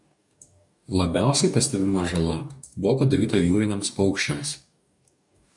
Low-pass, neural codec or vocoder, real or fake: 10.8 kHz; codec, 44.1 kHz, 2.6 kbps, DAC; fake